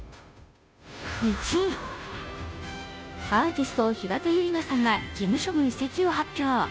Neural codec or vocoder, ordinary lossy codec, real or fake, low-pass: codec, 16 kHz, 0.5 kbps, FunCodec, trained on Chinese and English, 25 frames a second; none; fake; none